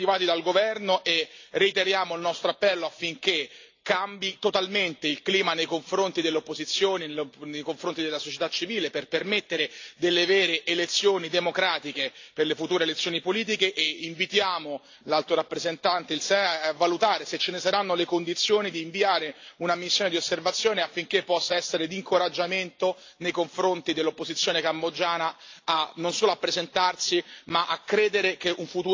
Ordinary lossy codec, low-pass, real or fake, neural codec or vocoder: AAC, 48 kbps; 7.2 kHz; real; none